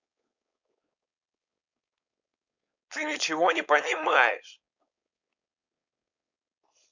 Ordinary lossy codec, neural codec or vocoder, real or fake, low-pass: none; codec, 16 kHz, 4.8 kbps, FACodec; fake; 7.2 kHz